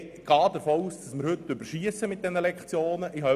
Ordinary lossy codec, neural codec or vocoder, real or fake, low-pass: none; none; real; 14.4 kHz